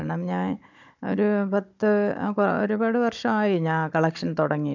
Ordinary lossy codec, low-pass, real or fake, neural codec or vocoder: none; 7.2 kHz; fake; codec, 16 kHz, 16 kbps, FunCodec, trained on Chinese and English, 50 frames a second